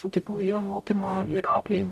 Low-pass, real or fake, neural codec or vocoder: 14.4 kHz; fake; codec, 44.1 kHz, 0.9 kbps, DAC